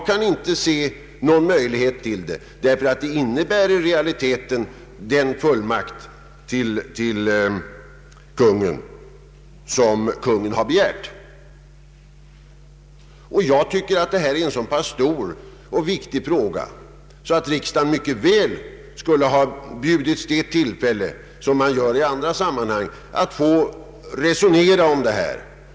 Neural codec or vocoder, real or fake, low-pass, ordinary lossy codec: none; real; none; none